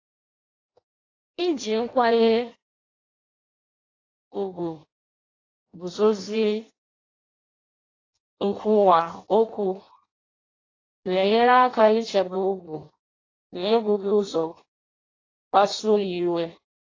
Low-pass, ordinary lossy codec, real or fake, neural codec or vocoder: 7.2 kHz; AAC, 32 kbps; fake; codec, 16 kHz in and 24 kHz out, 0.6 kbps, FireRedTTS-2 codec